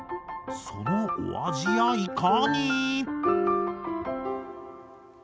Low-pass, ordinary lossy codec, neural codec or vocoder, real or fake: none; none; none; real